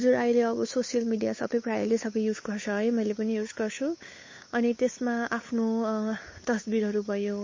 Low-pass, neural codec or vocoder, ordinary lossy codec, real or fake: 7.2 kHz; codec, 16 kHz, 8 kbps, FunCodec, trained on Chinese and English, 25 frames a second; MP3, 32 kbps; fake